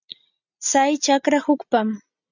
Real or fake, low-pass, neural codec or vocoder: real; 7.2 kHz; none